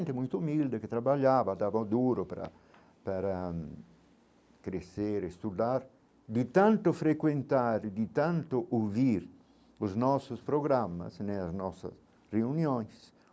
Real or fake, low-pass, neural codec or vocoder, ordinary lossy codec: real; none; none; none